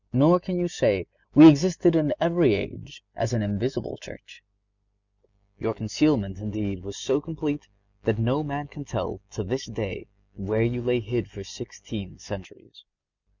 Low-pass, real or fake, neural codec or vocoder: 7.2 kHz; real; none